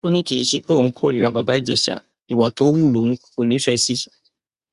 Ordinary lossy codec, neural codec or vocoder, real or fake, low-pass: Opus, 64 kbps; codec, 24 kHz, 1 kbps, SNAC; fake; 10.8 kHz